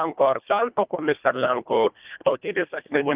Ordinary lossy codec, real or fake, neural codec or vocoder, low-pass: Opus, 24 kbps; fake; codec, 24 kHz, 1.5 kbps, HILCodec; 3.6 kHz